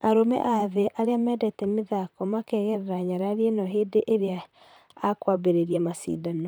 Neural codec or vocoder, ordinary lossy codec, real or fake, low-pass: vocoder, 44.1 kHz, 128 mel bands, Pupu-Vocoder; none; fake; none